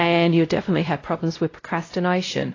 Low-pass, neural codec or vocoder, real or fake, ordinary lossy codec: 7.2 kHz; codec, 16 kHz, 0.5 kbps, X-Codec, WavLM features, trained on Multilingual LibriSpeech; fake; AAC, 32 kbps